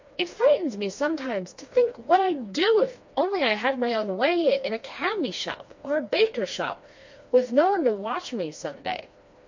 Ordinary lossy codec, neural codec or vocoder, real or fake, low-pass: MP3, 48 kbps; codec, 16 kHz, 2 kbps, FreqCodec, smaller model; fake; 7.2 kHz